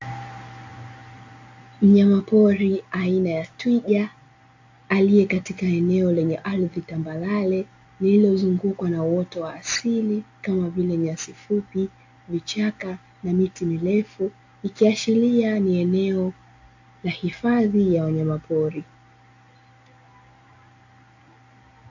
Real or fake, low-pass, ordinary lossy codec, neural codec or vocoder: real; 7.2 kHz; AAC, 48 kbps; none